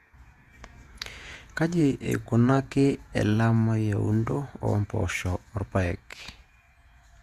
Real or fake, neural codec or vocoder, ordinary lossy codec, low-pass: fake; vocoder, 48 kHz, 128 mel bands, Vocos; Opus, 64 kbps; 14.4 kHz